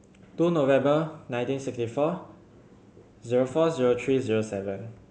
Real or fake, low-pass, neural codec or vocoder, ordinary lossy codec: real; none; none; none